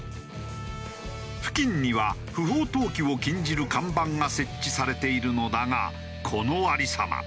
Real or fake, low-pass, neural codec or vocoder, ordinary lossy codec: real; none; none; none